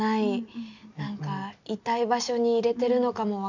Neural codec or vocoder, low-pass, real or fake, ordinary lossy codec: none; 7.2 kHz; real; none